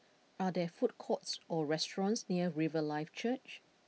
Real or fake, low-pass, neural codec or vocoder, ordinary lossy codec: real; none; none; none